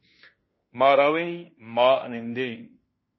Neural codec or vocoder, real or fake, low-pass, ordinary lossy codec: codec, 16 kHz in and 24 kHz out, 0.9 kbps, LongCat-Audio-Codec, fine tuned four codebook decoder; fake; 7.2 kHz; MP3, 24 kbps